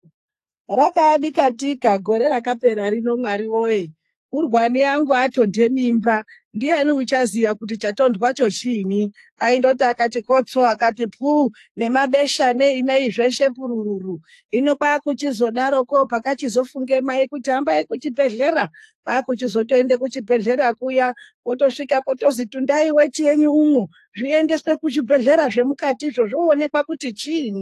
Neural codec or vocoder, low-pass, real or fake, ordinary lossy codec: codec, 44.1 kHz, 2.6 kbps, SNAC; 14.4 kHz; fake; AAC, 64 kbps